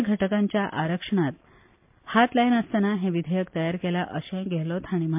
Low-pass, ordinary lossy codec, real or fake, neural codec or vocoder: 3.6 kHz; MP3, 32 kbps; real; none